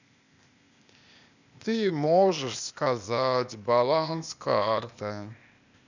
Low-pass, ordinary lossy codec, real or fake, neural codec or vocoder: 7.2 kHz; none; fake; codec, 16 kHz, 0.8 kbps, ZipCodec